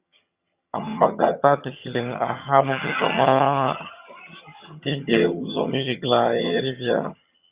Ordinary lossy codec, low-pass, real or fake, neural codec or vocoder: Opus, 64 kbps; 3.6 kHz; fake; vocoder, 22.05 kHz, 80 mel bands, HiFi-GAN